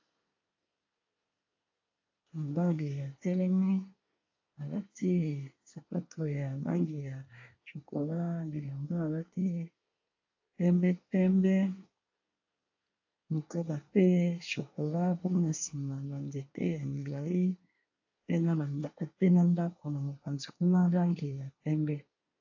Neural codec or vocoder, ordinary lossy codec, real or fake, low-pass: codec, 24 kHz, 1 kbps, SNAC; AAC, 48 kbps; fake; 7.2 kHz